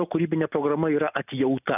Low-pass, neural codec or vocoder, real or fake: 3.6 kHz; none; real